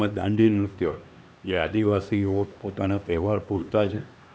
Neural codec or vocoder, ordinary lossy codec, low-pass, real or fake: codec, 16 kHz, 2 kbps, X-Codec, HuBERT features, trained on LibriSpeech; none; none; fake